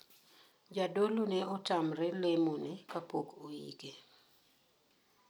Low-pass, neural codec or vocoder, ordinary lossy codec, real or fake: none; none; none; real